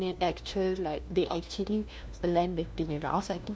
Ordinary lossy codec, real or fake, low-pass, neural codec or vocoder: none; fake; none; codec, 16 kHz, 1 kbps, FunCodec, trained on LibriTTS, 50 frames a second